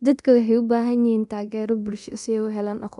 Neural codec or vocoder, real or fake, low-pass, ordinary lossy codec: codec, 24 kHz, 0.9 kbps, DualCodec; fake; 10.8 kHz; none